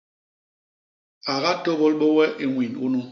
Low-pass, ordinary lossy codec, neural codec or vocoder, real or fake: 7.2 kHz; MP3, 48 kbps; none; real